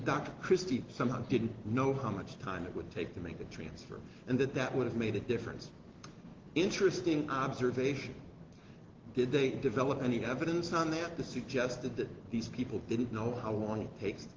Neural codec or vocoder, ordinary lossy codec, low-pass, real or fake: none; Opus, 16 kbps; 7.2 kHz; real